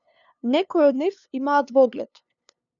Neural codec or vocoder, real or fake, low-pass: codec, 16 kHz, 2 kbps, FunCodec, trained on LibriTTS, 25 frames a second; fake; 7.2 kHz